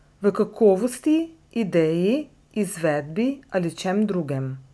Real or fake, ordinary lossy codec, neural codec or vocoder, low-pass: real; none; none; none